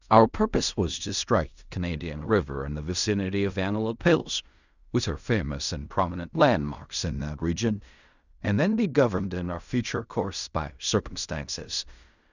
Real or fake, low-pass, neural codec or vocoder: fake; 7.2 kHz; codec, 16 kHz in and 24 kHz out, 0.4 kbps, LongCat-Audio-Codec, fine tuned four codebook decoder